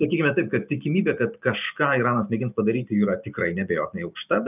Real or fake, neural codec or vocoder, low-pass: fake; vocoder, 44.1 kHz, 128 mel bands every 512 samples, BigVGAN v2; 3.6 kHz